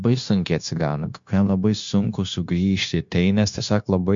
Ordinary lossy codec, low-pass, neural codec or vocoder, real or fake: MP3, 48 kbps; 7.2 kHz; codec, 16 kHz, 0.9 kbps, LongCat-Audio-Codec; fake